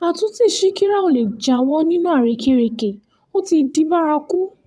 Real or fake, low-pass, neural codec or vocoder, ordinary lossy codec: fake; none; vocoder, 22.05 kHz, 80 mel bands, WaveNeXt; none